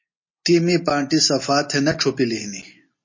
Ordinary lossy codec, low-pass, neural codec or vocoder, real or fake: MP3, 32 kbps; 7.2 kHz; none; real